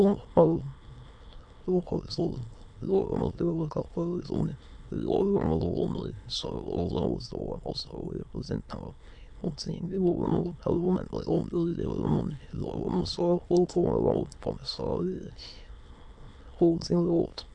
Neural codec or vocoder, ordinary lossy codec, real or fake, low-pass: autoencoder, 22.05 kHz, a latent of 192 numbers a frame, VITS, trained on many speakers; AAC, 64 kbps; fake; 9.9 kHz